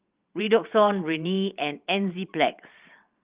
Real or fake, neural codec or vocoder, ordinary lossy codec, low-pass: fake; vocoder, 22.05 kHz, 80 mel bands, WaveNeXt; Opus, 32 kbps; 3.6 kHz